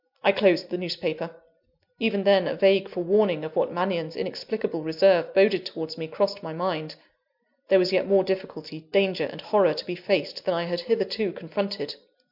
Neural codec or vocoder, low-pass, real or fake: none; 5.4 kHz; real